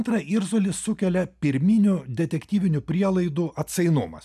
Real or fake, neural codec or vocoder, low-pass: real; none; 14.4 kHz